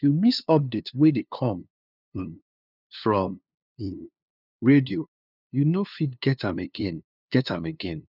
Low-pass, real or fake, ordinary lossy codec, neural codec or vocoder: 5.4 kHz; fake; none; codec, 16 kHz, 2 kbps, FunCodec, trained on LibriTTS, 25 frames a second